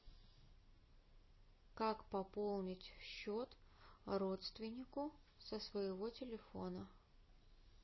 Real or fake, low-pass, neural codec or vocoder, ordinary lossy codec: real; 7.2 kHz; none; MP3, 24 kbps